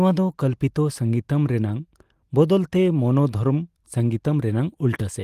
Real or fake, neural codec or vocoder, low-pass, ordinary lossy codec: real; none; 14.4 kHz; Opus, 24 kbps